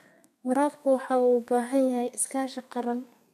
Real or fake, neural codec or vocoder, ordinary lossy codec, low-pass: fake; codec, 32 kHz, 1.9 kbps, SNAC; none; 14.4 kHz